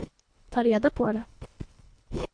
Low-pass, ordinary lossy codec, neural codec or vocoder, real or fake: 9.9 kHz; MP3, 64 kbps; codec, 24 kHz, 1.5 kbps, HILCodec; fake